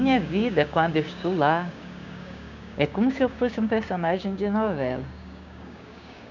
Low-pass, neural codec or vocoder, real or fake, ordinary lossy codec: 7.2 kHz; codec, 16 kHz, 6 kbps, DAC; fake; none